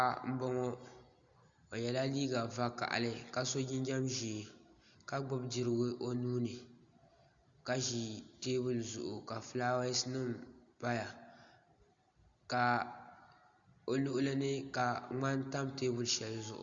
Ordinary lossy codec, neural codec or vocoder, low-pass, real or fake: Opus, 64 kbps; none; 7.2 kHz; real